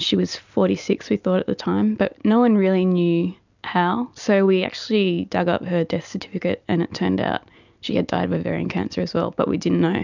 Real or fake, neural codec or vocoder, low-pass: real; none; 7.2 kHz